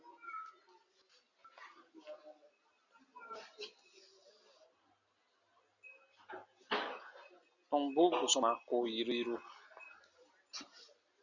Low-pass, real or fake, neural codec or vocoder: 7.2 kHz; real; none